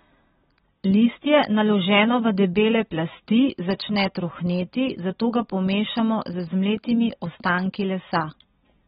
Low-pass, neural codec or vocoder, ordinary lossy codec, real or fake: 14.4 kHz; none; AAC, 16 kbps; real